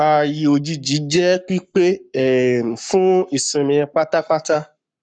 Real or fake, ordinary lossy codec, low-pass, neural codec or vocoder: fake; none; 9.9 kHz; codec, 44.1 kHz, 7.8 kbps, Pupu-Codec